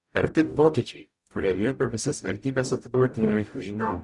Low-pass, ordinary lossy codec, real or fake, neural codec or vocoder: 10.8 kHz; Opus, 64 kbps; fake; codec, 44.1 kHz, 0.9 kbps, DAC